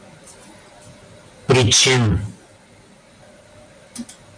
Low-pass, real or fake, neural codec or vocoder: 9.9 kHz; real; none